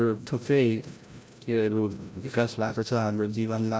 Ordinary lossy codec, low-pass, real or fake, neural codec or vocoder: none; none; fake; codec, 16 kHz, 0.5 kbps, FreqCodec, larger model